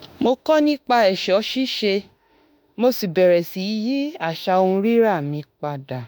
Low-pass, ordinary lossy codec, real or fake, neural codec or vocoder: none; none; fake; autoencoder, 48 kHz, 32 numbers a frame, DAC-VAE, trained on Japanese speech